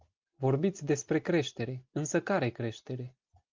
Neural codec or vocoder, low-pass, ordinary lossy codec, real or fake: none; 7.2 kHz; Opus, 32 kbps; real